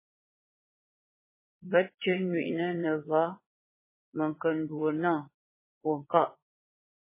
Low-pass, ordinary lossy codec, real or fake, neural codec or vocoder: 3.6 kHz; MP3, 16 kbps; fake; vocoder, 22.05 kHz, 80 mel bands, WaveNeXt